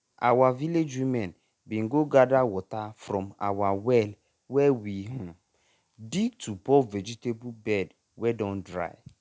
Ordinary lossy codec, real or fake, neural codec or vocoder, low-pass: none; real; none; none